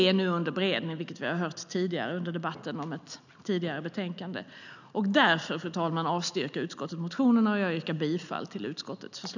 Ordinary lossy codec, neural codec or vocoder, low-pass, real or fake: none; none; 7.2 kHz; real